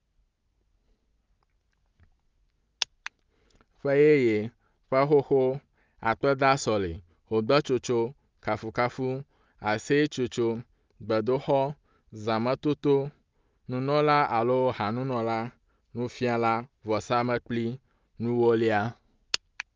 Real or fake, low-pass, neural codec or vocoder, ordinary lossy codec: real; 7.2 kHz; none; Opus, 24 kbps